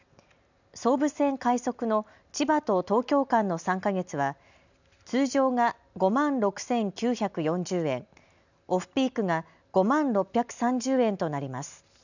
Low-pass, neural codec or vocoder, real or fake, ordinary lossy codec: 7.2 kHz; none; real; none